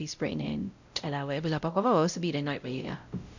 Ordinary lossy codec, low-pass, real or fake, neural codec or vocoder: none; 7.2 kHz; fake; codec, 16 kHz, 0.5 kbps, X-Codec, WavLM features, trained on Multilingual LibriSpeech